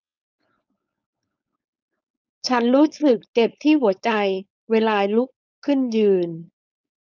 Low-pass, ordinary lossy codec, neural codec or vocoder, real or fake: 7.2 kHz; none; codec, 16 kHz, 4.8 kbps, FACodec; fake